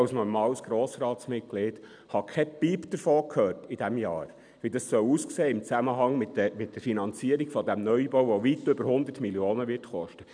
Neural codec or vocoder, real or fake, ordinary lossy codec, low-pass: none; real; none; 9.9 kHz